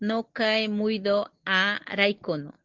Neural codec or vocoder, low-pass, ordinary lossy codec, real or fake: none; 7.2 kHz; Opus, 16 kbps; real